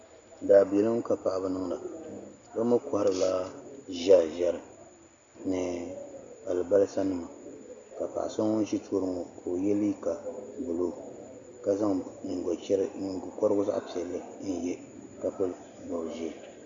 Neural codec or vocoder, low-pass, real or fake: none; 7.2 kHz; real